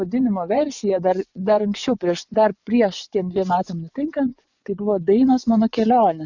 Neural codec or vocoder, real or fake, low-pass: vocoder, 24 kHz, 100 mel bands, Vocos; fake; 7.2 kHz